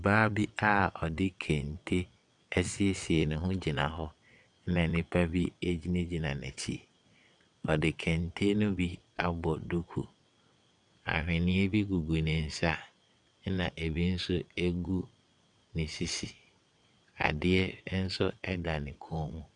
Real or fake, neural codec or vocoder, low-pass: fake; vocoder, 22.05 kHz, 80 mel bands, WaveNeXt; 9.9 kHz